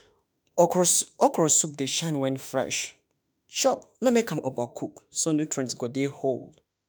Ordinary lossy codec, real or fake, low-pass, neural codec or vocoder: none; fake; none; autoencoder, 48 kHz, 32 numbers a frame, DAC-VAE, trained on Japanese speech